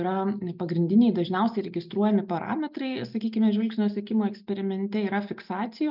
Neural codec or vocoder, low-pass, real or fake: none; 5.4 kHz; real